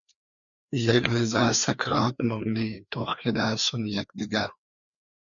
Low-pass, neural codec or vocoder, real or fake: 7.2 kHz; codec, 16 kHz, 2 kbps, FreqCodec, larger model; fake